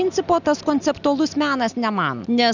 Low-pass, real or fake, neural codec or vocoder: 7.2 kHz; real; none